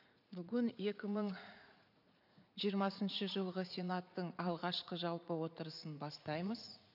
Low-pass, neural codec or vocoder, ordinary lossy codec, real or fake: 5.4 kHz; vocoder, 44.1 kHz, 80 mel bands, Vocos; none; fake